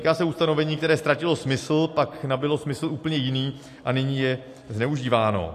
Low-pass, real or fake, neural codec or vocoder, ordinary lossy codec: 14.4 kHz; real; none; AAC, 64 kbps